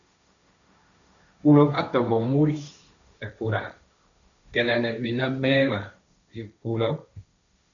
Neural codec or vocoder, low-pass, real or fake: codec, 16 kHz, 1.1 kbps, Voila-Tokenizer; 7.2 kHz; fake